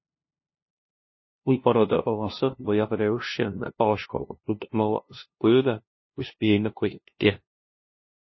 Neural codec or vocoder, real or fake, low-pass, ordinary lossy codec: codec, 16 kHz, 0.5 kbps, FunCodec, trained on LibriTTS, 25 frames a second; fake; 7.2 kHz; MP3, 24 kbps